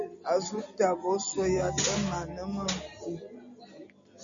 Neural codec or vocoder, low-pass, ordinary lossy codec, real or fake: none; 7.2 kHz; MP3, 96 kbps; real